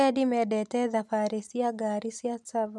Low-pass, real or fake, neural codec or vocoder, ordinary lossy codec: none; real; none; none